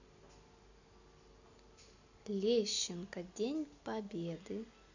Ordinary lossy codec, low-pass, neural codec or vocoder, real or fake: none; 7.2 kHz; none; real